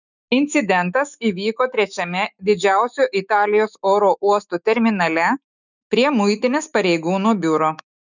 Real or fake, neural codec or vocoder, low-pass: fake; autoencoder, 48 kHz, 128 numbers a frame, DAC-VAE, trained on Japanese speech; 7.2 kHz